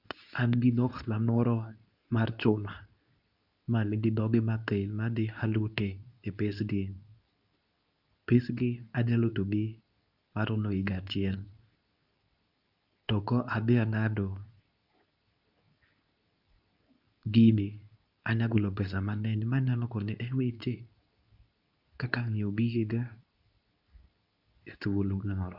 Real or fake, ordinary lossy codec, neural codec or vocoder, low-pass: fake; none; codec, 24 kHz, 0.9 kbps, WavTokenizer, medium speech release version 2; 5.4 kHz